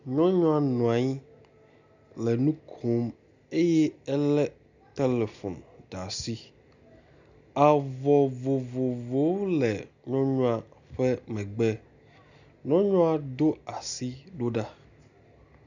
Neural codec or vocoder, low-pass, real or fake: none; 7.2 kHz; real